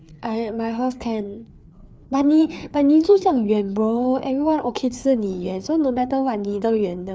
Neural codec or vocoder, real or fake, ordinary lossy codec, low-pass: codec, 16 kHz, 4 kbps, FreqCodec, larger model; fake; none; none